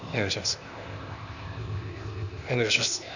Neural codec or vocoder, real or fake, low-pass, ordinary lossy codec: codec, 16 kHz, 0.8 kbps, ZipCodec; fake; 7.2 kHz; none